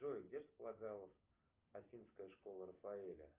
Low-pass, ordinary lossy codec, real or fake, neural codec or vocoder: 3.6 kHz; Opus, 24 kbps; real; none